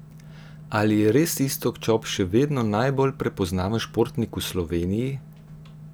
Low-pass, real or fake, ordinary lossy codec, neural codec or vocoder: none; real; none; none